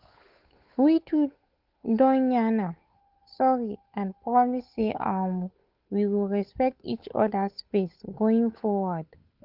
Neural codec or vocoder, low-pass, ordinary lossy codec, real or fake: codec, 16 kHz, 8 kbps, FunCodec, trained on Chinese and English, 25 frames a second; 5.4 kHz; Opus, 24 kbps; fake